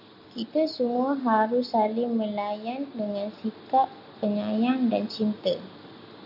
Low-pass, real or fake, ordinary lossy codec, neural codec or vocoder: 5.4 kHz; real; AAC, 48 kbps; none